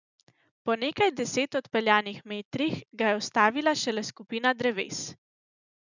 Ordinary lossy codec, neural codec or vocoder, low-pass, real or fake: none; none; 7.2 kHz; real